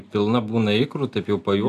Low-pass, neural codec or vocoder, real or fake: 14.4 kHz; none; real